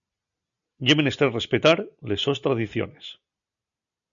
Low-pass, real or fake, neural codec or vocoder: 7.2 kHz; real; none